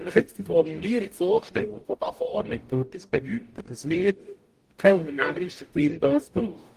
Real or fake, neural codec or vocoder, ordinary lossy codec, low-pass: fake; codec, 44.1 kHz, 0.9 kbps, DAC; Opus, 24 kbps; 14.4 kHz